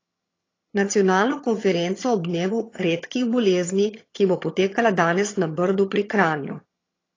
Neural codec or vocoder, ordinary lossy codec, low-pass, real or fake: vocoder, 22.05 kHz, 80 mel bands, HiFi-GAN; AAC, 32 kbps; 7.2 kHz; fake